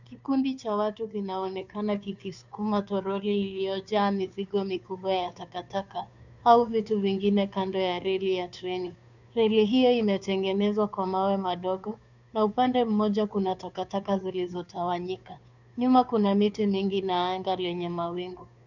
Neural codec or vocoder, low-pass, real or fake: codec, 16 kHz, 2 kbps, FunCodec, trained on Chinese and English, 25 frames a second; 7.2 kHz; fake